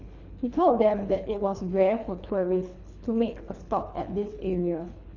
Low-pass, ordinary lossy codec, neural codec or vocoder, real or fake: 7.2 kHz; none; codec, 24 kHz, 3 kbps, HILCodec; fake